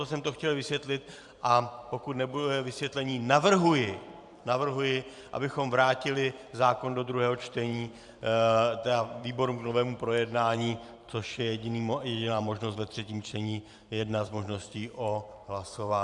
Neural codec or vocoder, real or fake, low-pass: vocoder, 44.1 kHz, 128 mel bands every 512 samples, BigVGAN v2; fake; 10.8 kHz